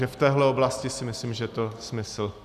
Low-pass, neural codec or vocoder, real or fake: 14.4 kHz; none; real